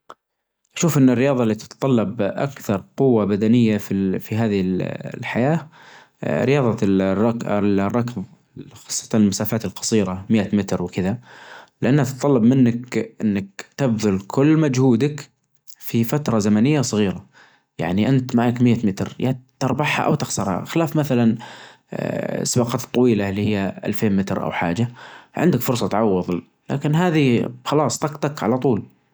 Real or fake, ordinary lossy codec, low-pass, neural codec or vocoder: real; none; none; none